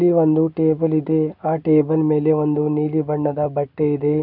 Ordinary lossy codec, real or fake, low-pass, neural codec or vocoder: none; real; 5.4 kHz; none